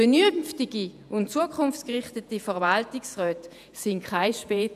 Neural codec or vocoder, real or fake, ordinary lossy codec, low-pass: vocoder, 48 kHz, 128 mel bands, Vocos; fake; none; 14.4 kHz